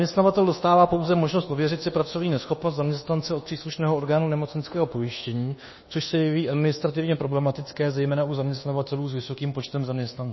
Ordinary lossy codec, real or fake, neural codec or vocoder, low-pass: MP3, 24 kbps; fake; codec, 24 kHz, 1.2 kbps, DualCodec; 7.2 kHz